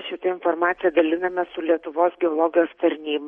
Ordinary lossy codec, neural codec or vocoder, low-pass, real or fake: MP3, 48 kbps; none; 19.8 kHz; real